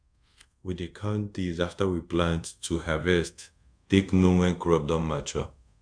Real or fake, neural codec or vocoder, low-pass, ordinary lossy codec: fake; codec, 24 kHz, 0.5 kbps, DualCodec; 9.9 kHz; none